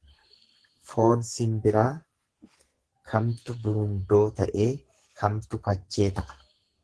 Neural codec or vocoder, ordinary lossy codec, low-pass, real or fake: codec, 44.1 kHz, 2.6 kbps, SNAC; Opus, 16 kbps; 10.8 kHz; fake